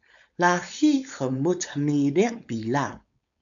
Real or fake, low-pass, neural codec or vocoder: fake; 7.2 kHz; codec, 16 kHz, 4.8 kbps, FACodec